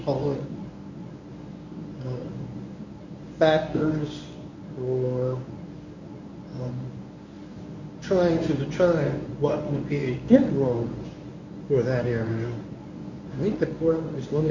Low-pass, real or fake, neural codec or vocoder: 7.2 kHz; fake; codec, 24 kHz, 0.9 kbps, WavTokenizer, medium speech release version 1